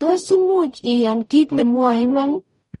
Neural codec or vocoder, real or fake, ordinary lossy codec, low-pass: codec, 44.1 kHz, 0.9 kbps, DAC; fake; MP3, 48 kbps; 19.8 kHz